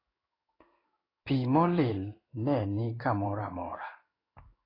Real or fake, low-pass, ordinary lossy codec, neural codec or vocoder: fake; 5.4 kHz; AAC, 24 kbps; codec, 16 kHz in and 24 kHz out, 1 kbps, XY-Tokenizer